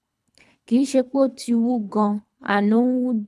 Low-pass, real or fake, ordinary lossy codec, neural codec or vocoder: none; fake; none; codec, 24 kHz, 3 kbps, HILCodec